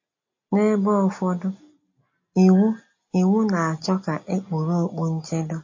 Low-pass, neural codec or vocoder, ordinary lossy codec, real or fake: 7.2 kHz; none; MP3, 32 kbps; real